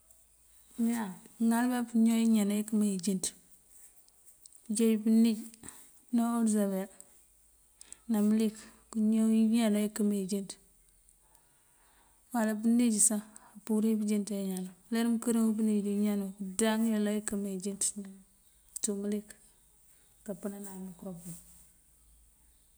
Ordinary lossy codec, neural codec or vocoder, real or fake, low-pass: none; none; real; none